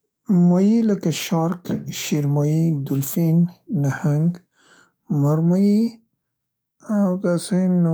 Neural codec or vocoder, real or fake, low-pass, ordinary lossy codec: codec, 44.1 kHz, 7.8 kbps, DAC; fake; none; none